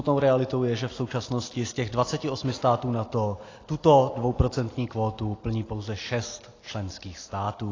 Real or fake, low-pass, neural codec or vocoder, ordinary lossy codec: real; 7.2 kHz; none; AAC, 32 kbps